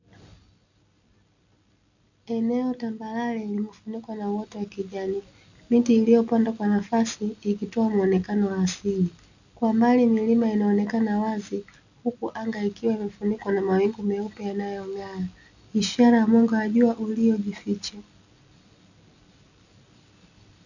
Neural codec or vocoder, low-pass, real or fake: none; 7.2 kHz; real